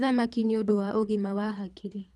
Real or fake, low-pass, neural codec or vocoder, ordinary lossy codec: fake; none; codec, 24 kHz, 3 kbps, HILCodec; none